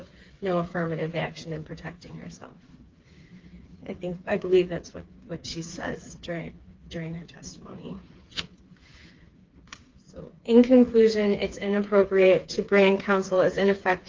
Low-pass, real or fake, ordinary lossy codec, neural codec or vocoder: 7.2 kHz; fake; Opus, 32 kbps; codec, 16 kHz, 4 kbps, FreqCodec, smaller model